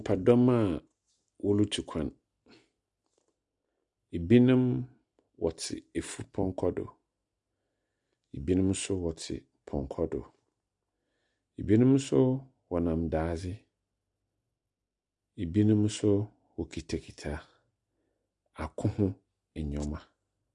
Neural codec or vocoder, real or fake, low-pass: none; real; 10.8 kHz